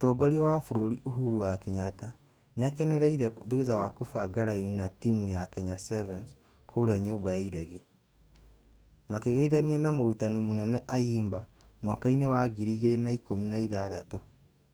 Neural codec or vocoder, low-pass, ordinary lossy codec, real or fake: codec, 44.1 kHz, 2.6 kbps, DAC; none; none; fake